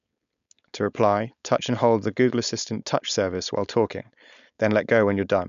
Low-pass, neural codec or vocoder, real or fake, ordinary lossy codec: 7.2 kHz; codec, 16 kHz, 4.8 kbps, FACodec; fake; none